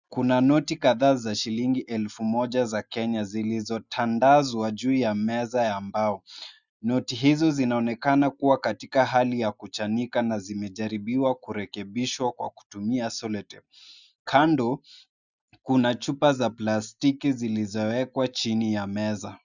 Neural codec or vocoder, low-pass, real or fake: none; 7.2 kHz; real